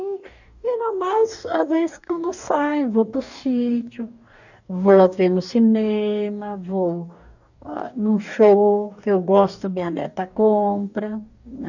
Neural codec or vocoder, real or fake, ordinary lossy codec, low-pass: codec, 44.1 kHz, 2.6 kbps, DAC; fake; none; 7.2 kHz